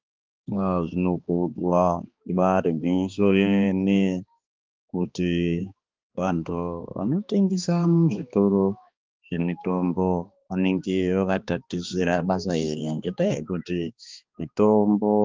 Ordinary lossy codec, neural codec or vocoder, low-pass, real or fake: Opus, 24 kbps; codec, 16 kHz, 2 kbps, X-Codec, HuBERT features, trained on balanced general audio; 7.2 kHz; fake